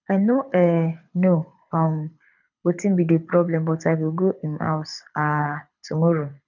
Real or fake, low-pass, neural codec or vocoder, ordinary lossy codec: fake; 7.2 kHz; codec, 24 kHz, 6 kbps, HILCodec; none